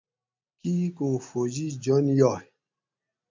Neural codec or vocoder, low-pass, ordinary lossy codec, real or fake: none; 7.2 kHz; MP3, 48 kbps; real